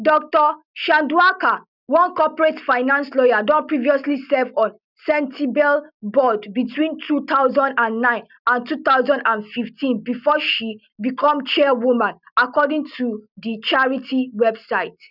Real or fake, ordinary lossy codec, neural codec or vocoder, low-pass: real; none; none; 5.4 kHz